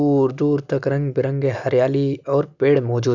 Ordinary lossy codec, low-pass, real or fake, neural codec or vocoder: none; 7.2 kHz; real; none